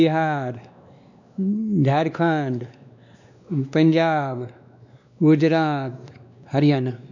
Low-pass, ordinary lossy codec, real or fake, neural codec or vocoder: 7.2 kHz; none; fake; codec, 16 kHz, 2 kbps, X-Codec, WavLM features, trained on Multilingual LibriSpeech